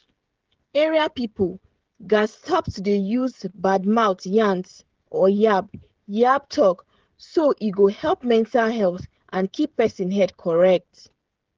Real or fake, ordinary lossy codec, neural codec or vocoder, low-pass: fake; Opus, 16 kbps; codec, 16 kHz, 16 kbps, FreqCodec, smaller model; 7.2 kHz